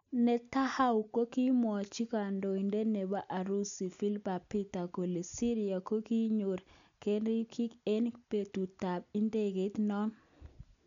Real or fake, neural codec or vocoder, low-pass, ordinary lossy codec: real; none; 7.2 kHz; none